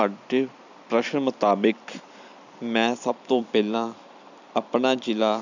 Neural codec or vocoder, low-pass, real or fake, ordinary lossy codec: vocoder, 44.1 kHz, 128 mel bands every 256 samples, BigVGAN v2; 7.2 kHz; fake; none